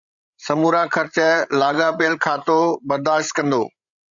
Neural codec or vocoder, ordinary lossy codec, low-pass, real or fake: codec, 16 kHz, 16 kbps, FreqCodec, larger model; Opus, 64 kbps; 7.2 kHz; fake